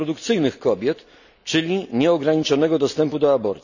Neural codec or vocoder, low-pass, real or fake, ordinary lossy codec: none; 7.2 kHz; real; none